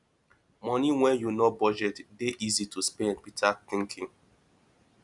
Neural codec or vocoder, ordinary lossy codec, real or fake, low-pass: none; none; real; 10.8 kHz